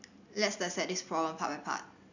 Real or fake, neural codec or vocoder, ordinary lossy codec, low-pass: real; none; none; 7.2 kHz